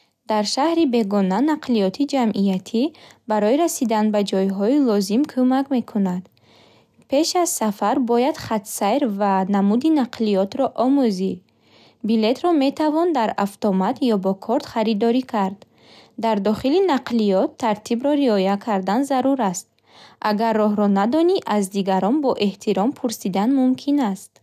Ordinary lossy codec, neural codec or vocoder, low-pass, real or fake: none; none; 14.4 kHz; real